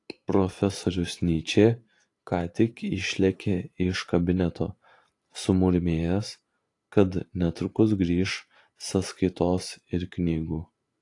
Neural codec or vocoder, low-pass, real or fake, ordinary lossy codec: vocoder, 44.1 kHz, 128 mel bands every 512 samples, BigVGAN v2; 10.8 kHz; fake; AAC, 48 kbps